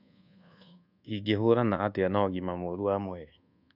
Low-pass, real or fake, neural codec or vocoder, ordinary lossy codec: 5.4 kHz; fake; codec, 24 kHz, 1.2 kbps, DualCodec; none